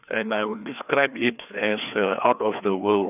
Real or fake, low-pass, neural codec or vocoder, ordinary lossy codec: fake; 3.6 kHz; codec, 16 kHz, 2 kbps, FreqCodec, larger model; none